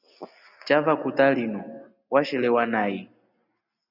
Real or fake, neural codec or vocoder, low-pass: real; none; 5.4 kHz